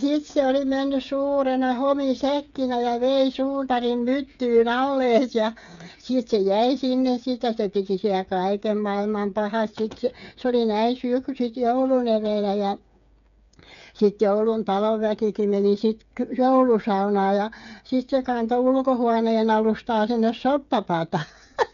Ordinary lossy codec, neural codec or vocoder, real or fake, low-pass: none; codec, 16 kHz, 8 kbps, FreqCodec, smaller model; fake; 7.2 kHz